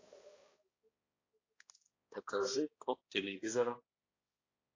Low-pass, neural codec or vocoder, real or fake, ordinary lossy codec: 7.2 kHz; codec, 16 kHz, 1 kbps, X-Codec, HuBERT features, trained on balanced general audio; fake; AAC, 32 kbps